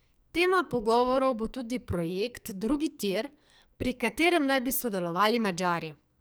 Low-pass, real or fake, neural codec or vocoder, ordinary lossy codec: none; fake; codec, 44.1 kHz, 2.6 kbps, SNAC; none